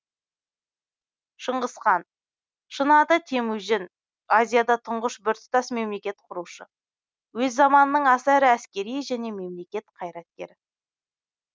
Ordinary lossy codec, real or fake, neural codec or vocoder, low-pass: none; real; none; none